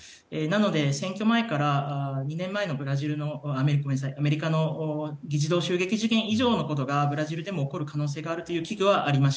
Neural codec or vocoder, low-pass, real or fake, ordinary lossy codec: none; none; real; none